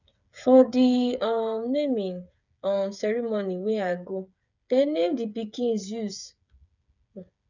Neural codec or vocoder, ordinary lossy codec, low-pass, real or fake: codec, 16 kHz, 8 kbps, FreqCodec, smaller model; none; 7.2 kHz; fake